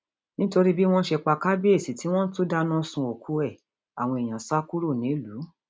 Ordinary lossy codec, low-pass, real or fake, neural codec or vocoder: none; none; real; none